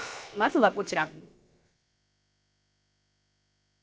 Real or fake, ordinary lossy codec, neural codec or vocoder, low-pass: fake; none; codec, 16 kHz, about 1 kbps, DyCAST, with the encoder's durations; none